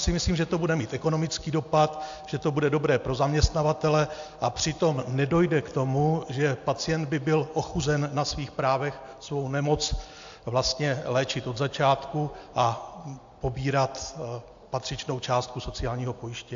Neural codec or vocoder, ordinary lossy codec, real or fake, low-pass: none; AAC, 64 kbps; real; 7.2 kHz